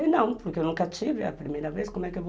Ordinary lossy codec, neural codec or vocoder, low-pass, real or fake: none; none; none; real